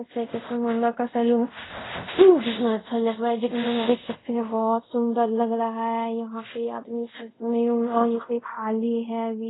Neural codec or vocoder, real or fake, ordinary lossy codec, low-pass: codec, 24 kHz, 0.5 kbps, DualCodec; fake; AAC, 16 kbps; 7.2 kHz